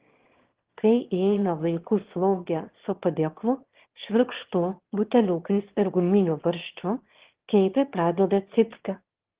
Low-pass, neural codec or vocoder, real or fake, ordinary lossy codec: 3.6 kHz; autoencoder, 22.05 kHz, a latent of 192 numbers a frame, VITS, trained on one speaker; fake; Opus, 16 kbps